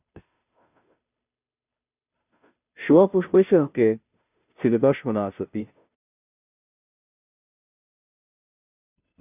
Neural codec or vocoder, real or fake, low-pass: codec, 16 kHz, 0.5 kbps, FunCodec, trained on Chinese and English, 25 frames a second; fake; 3.6 kHz